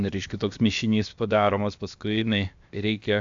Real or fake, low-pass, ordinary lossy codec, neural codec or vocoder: fake; 7.2 kHz; AAC, 64 kbps; codec, 16 kHz, about 1 kbps, DyCAST, with the encoder's durations